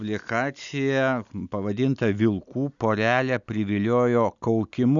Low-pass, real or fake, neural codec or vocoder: 7.2 kHz; real; none